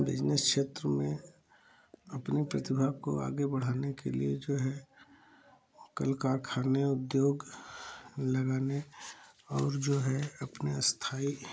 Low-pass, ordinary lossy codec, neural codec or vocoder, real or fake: none; none; none; real